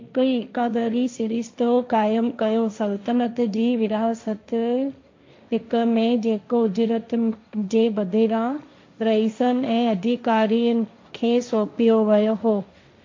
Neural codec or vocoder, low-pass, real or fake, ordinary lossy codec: codec, 16 kHz, 1.1 kbps, Voila-Tokenizer; 7.2 kHz; fake; MP3, 48 kbps